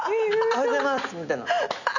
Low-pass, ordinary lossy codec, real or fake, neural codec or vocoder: 7.2 kHz; none; real; none